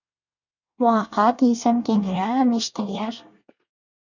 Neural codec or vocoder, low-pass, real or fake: codec, 24 kHz, 1 kbps, SNAC; 7.2 kHz; fake